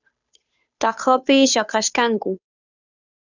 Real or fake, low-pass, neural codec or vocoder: fake; 7.2 kHz; codec, 16 kHz, 2 kbps, FunCodec, trained on Chinese and English, 25 frames a second